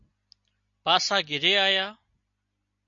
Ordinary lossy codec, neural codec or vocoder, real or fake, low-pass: MP3, 64 kbps; none; real; 7.2 kHz